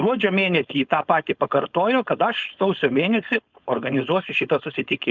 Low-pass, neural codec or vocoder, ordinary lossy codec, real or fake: 7.2 kHz; codec, 16 kHz, 4.8 kbps, FACodec; Opus, 64 kbps; fake